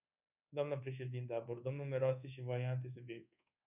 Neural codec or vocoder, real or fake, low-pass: codec, 24 kHz, 1.2 kbps, DualCodec; fake; 3.6 kHz